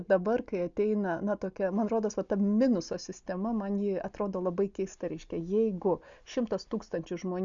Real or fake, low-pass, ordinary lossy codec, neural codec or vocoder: real; 7.2 kHz; Opus, 64 kbps; none